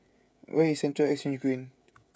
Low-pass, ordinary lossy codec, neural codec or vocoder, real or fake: none; none; codec, 16 kHz, 16 kbps, FreqCodec, smaller model; fake